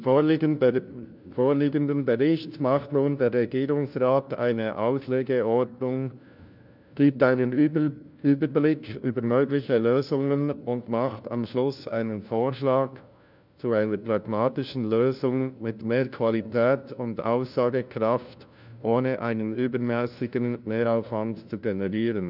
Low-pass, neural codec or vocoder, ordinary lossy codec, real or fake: 5.4 kHz; codec, 16 kHz, 1 kbps, FunCodec, trained on LibriTTS, 50 frames a second; none; fake